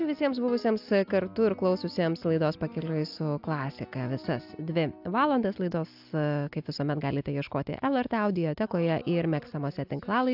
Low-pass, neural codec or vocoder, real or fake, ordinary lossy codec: 5.4 kHz; none; real; AAC, 48 kbps